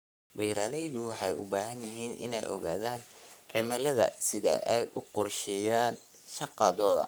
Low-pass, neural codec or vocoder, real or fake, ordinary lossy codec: none; codec, 44.1 kHz, 3.4 kbps, Pupu-Codec; fake; none